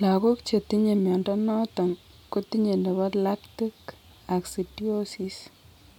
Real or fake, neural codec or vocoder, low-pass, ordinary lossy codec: real; none; 19.8 kHz; none